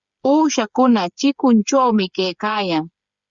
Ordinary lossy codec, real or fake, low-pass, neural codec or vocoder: Opus, 64 kbps; fake; 7.2 kHz; codec, 16 kHz, 8 kbps, FreqCodec, smaller model